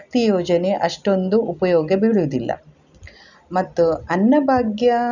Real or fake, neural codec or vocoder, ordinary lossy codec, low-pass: real; none; none; 7.2 kHz